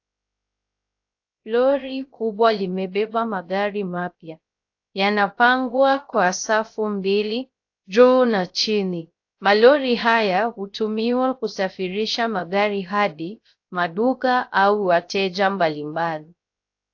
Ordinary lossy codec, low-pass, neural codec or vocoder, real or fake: AAC, 48 kbps; 7.2 kHz; codec, 16 kHz, 0.3 kbps, FocalCodec; fake